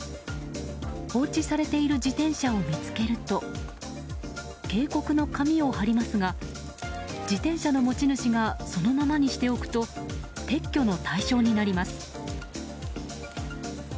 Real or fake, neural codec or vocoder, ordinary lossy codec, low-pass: real; none; none; none